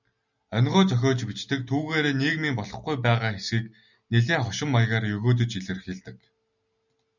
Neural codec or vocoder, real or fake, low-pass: none; real; 7.2 kHz